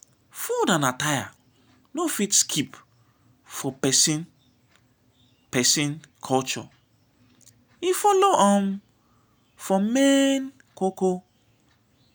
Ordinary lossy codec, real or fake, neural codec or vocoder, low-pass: none; real; none; none